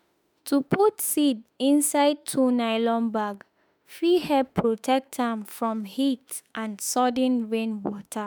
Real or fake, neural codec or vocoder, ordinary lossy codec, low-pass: fake; autoencoder, 48 kHz, 32 numbers a frame, DAC-VAE, trained on Japanese speech; none; none